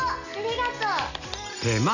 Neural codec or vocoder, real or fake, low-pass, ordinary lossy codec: none; real; 7.2 kHz; none